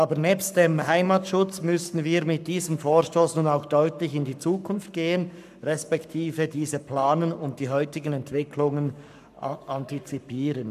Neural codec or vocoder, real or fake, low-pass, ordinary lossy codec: codec, 44.1 kHz, 7.8 kbps, Pupu-Codec; fake; 14.4 kHz; none